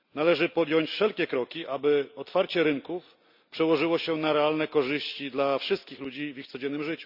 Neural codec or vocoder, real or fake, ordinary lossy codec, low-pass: none; real; Opus, 64 kbps; 5.4 kHz